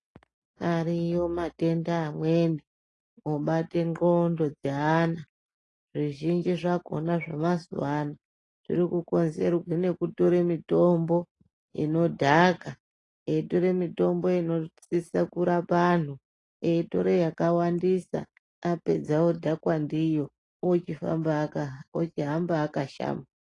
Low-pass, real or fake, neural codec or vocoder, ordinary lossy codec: 10.8 kHz; real; none; AAC, 32 kbps